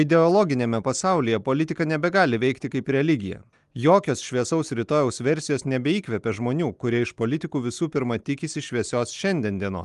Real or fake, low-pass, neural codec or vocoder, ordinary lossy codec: real; 10.8 kHz; none; Opus, 32 kbps